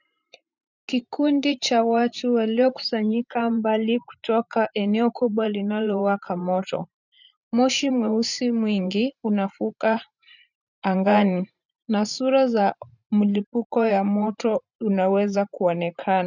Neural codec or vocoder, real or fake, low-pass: vocoder, 44.1 kHz, 128 mel bands every 512 samples, BigVGAN v2; fake; 7.2 kHz